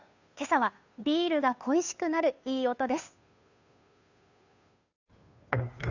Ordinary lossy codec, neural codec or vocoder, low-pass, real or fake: none; codec, 16 kHz, 2 kbps, FunCodec, trained on Chinese and English, 25 frames a second; 7.2 kHz; fake